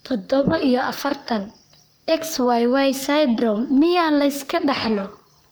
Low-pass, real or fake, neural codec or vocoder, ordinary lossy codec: none; fake; codec, 44.1 kHz, 3.4 kbps, Pupu-Codec; none